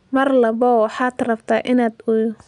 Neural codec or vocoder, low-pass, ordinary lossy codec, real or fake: none; 10.8 kHz; none; real